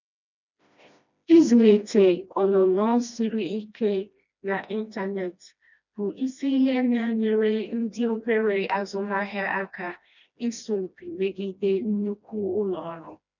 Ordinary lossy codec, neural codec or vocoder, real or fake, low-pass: none; codec, 16 kHz, 1 kbps, FreqCodec, smaller model; fake; 7.2 kHz